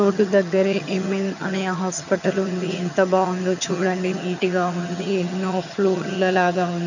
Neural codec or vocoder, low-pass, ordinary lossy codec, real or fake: vocoder, 22.05 kHz, 80 mel bands, HiFi-GAN; 7.2 kHz; none; fake